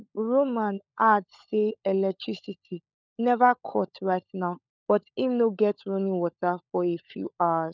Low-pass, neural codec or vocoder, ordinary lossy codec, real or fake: 7.2 kHz; codec, 16 kHz, 16 kbps, FunCodec, trained on LibriTTS, 50 frames a second; none; fake